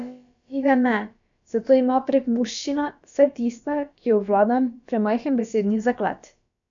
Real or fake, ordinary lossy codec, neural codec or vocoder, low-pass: fake; none; codec, 16 kHz, about 1 kbps, DyCAST, with the encoder's durations; 7.2 kHz